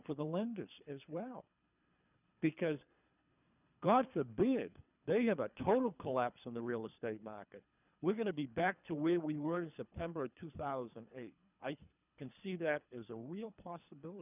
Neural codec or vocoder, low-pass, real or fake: codec, 24 kHz, 3 kbps, HILCodec; 3.6 kHz; fake